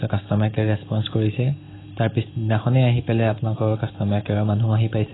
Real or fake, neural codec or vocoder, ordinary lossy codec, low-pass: real; none; AAC, 16 kbps; 7.2 kHz